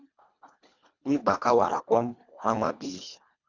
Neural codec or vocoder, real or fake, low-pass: codec, 24 kHz, 1.5 kbps, HILCodec; fake; 7.2 kHz